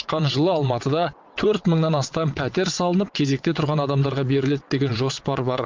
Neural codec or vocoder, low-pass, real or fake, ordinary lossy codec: vocoder, 22.05 kHz, 80 mel bands, WaveNeXt; 7.2 kHz; fake; Opus, 24 kbps